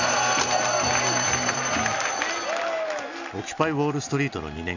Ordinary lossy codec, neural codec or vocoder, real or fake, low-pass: none; none; real; 7.2 kHz